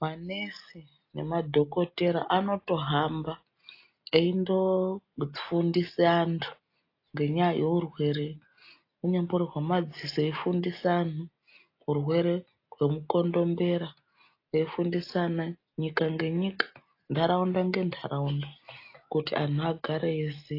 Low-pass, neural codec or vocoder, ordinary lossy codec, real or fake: 5.4 kHz; none; AAC, 32 kbps; real